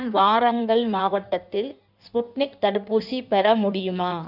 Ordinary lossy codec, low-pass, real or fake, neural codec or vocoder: none; 5.4 kHz; fake; codec, 16 kHz in and 24 kHz out, 1.1 kbps, FireRedTTS-2 codec